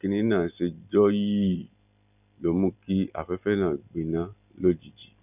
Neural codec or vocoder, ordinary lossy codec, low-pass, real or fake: none; none; 3.6 kHz; real